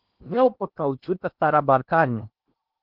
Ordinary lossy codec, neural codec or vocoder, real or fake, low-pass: Opus, 24 kbps; codec, 16 kHz in and 24 kHz out, 0.8 kbps, FocalCodec, streaming, 65536 codes; fake; 5.4 kHz